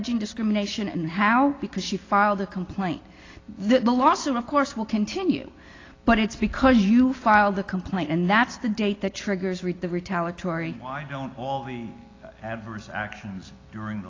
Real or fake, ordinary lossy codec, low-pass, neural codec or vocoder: real; AAC, 32 kbps; 7.2 kHz; none